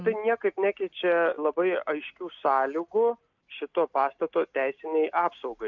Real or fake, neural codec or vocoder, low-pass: real; none; 7.2 kHz